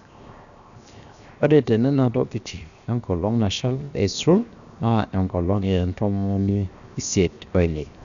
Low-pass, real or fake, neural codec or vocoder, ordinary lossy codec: 7.2 kHz; fake; codec, 16 kHz, 0.7 kbps, FocalCodec; none